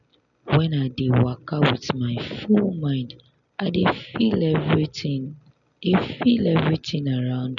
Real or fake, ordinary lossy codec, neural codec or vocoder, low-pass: real; none; none; 7.2 kHz